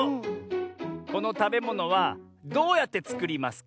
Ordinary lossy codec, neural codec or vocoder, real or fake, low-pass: none; none; real; none